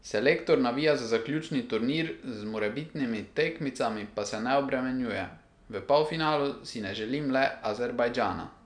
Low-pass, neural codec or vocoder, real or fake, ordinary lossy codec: 9.9 kHz; none; real; none